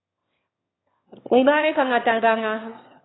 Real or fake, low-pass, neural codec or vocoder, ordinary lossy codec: fake; 7.2 kHz; autoencoder, 22.05 kHz, a latent of 192 numbers a frame, VITS, trained on one speaker; AAC, 16 kbps